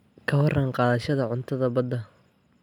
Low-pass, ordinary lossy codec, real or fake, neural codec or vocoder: 19.8 kHz; none; real; none